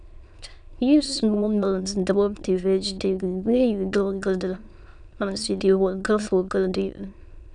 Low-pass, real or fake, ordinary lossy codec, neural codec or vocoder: 9.9 kHz; fake; none; autoencoder, 22.05 kHz, a latent of 192 numbers a frame, VITS, trained on many speakers